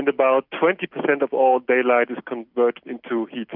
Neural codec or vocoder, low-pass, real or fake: none; 5.4 kHz; real